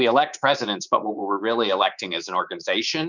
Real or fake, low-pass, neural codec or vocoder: fake; 7.2 kHz; codec, 24 kHz, 3.1 kbps, DualCodec